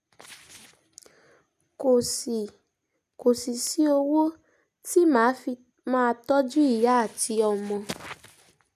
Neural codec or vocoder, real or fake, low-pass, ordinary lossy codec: none; real; 14.4 kHz; none